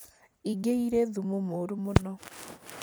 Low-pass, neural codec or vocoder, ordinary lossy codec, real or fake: none; vocoder, 44.1 kHz, 128 mel bands every 256 samples, BigVGAN v2; none; fake